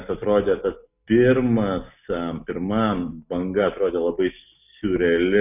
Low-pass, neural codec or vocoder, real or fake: 3.6 kHz; none; real